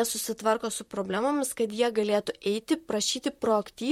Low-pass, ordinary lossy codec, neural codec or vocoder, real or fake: 19.8 kHz; MP3, 64 kbps; vocoder, 44.1 kHz, 128 mel bands, Pupu-Vocoder; fake